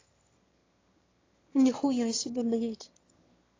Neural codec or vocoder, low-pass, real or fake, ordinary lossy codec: autoencoder, 22.05 kHz, a latent of 192 numbers a frame, VITS, trained on one speaker; 7.2 kHz; fake; AAC, 32 kbps